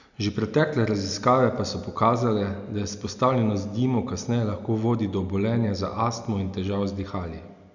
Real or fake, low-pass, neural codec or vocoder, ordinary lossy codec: real; 7.2 kHz; none; none